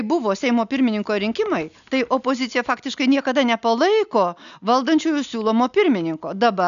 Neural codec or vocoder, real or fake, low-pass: none; real; 7.2 kHz